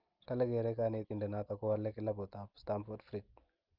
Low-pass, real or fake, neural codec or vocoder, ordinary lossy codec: 5.4 kHz; real; none; Opus, 24 kbps